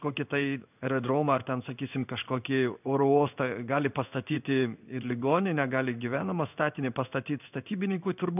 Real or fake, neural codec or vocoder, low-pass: fake; codec, 16 kHz in and 24 kHz out, 1 kbps, XY-Tokenizer; 3.6 kHz